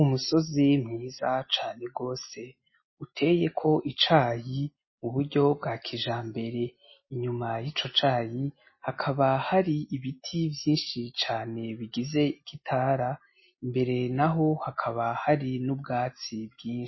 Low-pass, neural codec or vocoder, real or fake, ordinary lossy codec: 7.2 kHz; none; real; MP3, 24 kbps